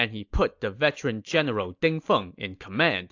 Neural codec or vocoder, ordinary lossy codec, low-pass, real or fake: none; AAC, 48 kbps; 7.2 kHz; real